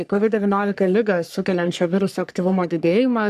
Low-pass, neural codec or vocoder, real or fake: 14.4 kHz; codec, 44.1 kHz, 3.4 kbps, Pupu-Codec; fake